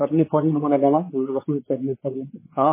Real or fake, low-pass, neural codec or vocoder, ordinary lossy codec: fake; 3.6 kHz; codec, 16 kHz, 4 kbps, X-Codec, WavLM features, trained on Multilingual LibriSpeech; MP3, 16 kbps